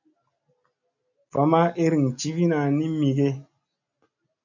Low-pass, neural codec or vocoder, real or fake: 7.2 kHz; none; real